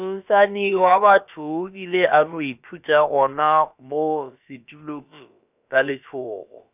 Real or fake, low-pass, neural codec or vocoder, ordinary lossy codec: fake; 3.6 kHz; codec, 16 kHz, about 1 kbps, DyCAST, with the encoder's durations; none